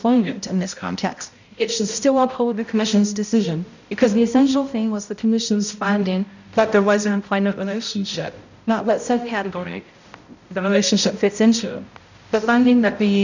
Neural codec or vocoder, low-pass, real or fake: codec, 16 kHz, 0.5 kbps, X-Codec, HuBERT features, trained on balanced general audio; 7.2 kHz; fake